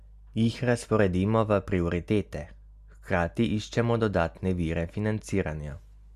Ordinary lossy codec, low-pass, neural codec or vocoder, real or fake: Opus, 64 kbps; 14.4 kHz; none; real